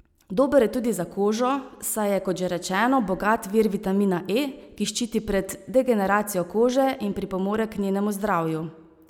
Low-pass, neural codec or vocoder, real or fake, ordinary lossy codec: 19.8 kHz; none; real; none